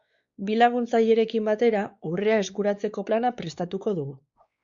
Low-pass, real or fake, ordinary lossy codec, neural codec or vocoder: 7.2 kHz; fake; Opus, 64 kbps; codec, 16 kHz, 4 kbps, X-Codec, WavLM features, trained on Multilingual LibriSpeech